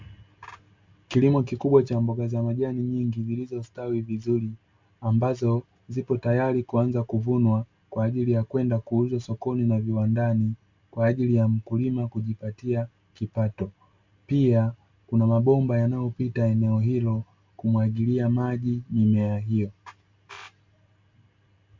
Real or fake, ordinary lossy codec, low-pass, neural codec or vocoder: real; MP3, 64 kbps; 7.2 kHz; none